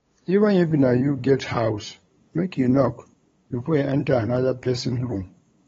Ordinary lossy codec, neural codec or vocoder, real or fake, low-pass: AAC, 24 kbps; codec, 16 kHz, 8 kbps, FunCodec, trained on LibriTTS, 25 frames a second; fake; 7.2 kHz